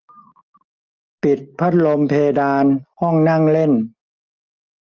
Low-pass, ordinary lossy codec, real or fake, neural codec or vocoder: 7.2 kHz; Opus, 24 kbps; real; none